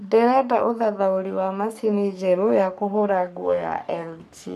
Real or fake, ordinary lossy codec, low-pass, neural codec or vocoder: fake; none; 14.4 kHz; codec, 44.1 kHz, 3.4 kbps, Pupu-Codec